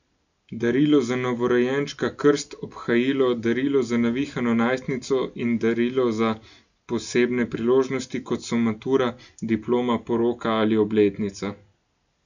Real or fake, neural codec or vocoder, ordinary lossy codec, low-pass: real; none; none; 7.2 kHz